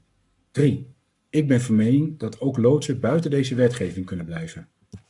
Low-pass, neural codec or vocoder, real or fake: 10.8 kHz; codec, 44.1 kHz, 7.8 kbps, Pupu-Codec; fake